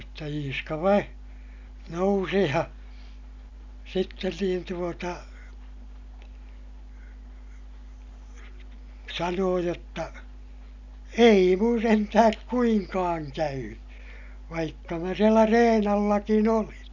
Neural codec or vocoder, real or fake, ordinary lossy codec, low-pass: none; real; none; 7.2 kHz